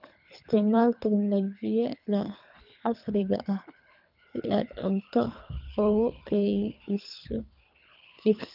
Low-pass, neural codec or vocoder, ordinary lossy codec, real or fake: 5.4 kHz; codec, 24 kHz, 3 kbps, HILCodec; none; fake